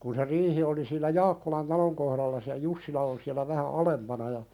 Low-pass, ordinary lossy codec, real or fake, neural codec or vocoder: 19.8 kHz; none; real; none